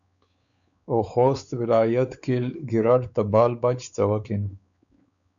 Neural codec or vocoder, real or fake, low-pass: codec, 16 kHz, 4 kbps, X-Codec, WavLM features, trained on Multilingual LibriSpeech; fake; 7.2 kHz